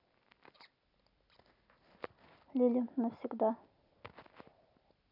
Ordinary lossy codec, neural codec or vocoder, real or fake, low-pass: none; none; real; 5.4 kHz